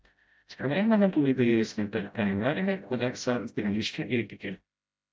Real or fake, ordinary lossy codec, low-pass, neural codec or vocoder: fake; none; none; codec, 16 kHz, 0.5 kbps, FreqCodec, smaller model